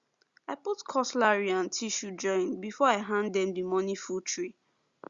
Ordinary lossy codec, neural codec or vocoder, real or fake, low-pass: Opus, 64 kbps; none; real; 7.2 kHz